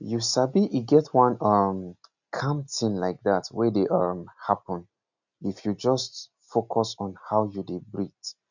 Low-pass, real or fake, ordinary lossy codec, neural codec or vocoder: 7.2 kHz; real; none; none